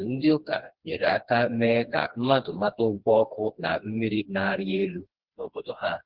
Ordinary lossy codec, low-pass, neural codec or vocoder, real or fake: Opus, 16 kbps; 5.4 kHz; codec, 16 kHz, 2 kbps, FreqCodec, smaller model; fake